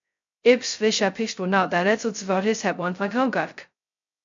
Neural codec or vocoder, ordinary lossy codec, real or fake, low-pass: codec, 16 kHz, 0.2 kbps, FocalCodec; MP3, 48 kbps; fake; 7.2 kHz